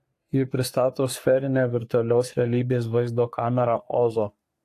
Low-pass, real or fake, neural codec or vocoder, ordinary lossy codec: 14.4 kHz; fake; codec, 44.1 kHz, 3.4 kbps, Pupu-Codec; AAC, 64 kbps